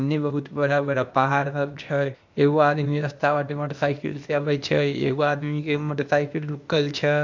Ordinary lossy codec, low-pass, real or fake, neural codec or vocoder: MP3, 64 kbps; 7.2 kHz; fake; codec, 16 kHz, 0.8 kbps, ZipCodec